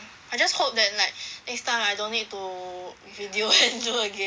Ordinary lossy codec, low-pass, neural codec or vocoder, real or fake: none; none; none; real